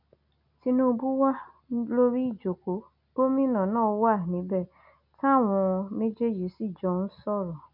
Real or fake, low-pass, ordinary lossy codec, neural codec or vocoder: real; 5.4 kHz; none; none